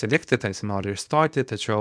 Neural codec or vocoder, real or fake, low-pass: codec, 24 kHz, 0.9 kbps, WavTokenizer, small release; fake; 9.9 kHz